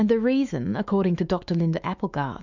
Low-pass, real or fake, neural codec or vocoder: 7.2 kHz; fake; autoencoder, 48 kHz, 128 numbers a frame, DAC-VAE, trained on Japanese speech